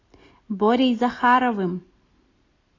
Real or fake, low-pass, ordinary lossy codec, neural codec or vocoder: real; 7.2 kHz; AAC, 32 kbps; none